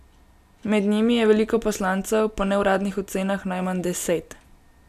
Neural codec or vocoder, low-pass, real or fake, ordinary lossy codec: none; 14.4 kHz; real; none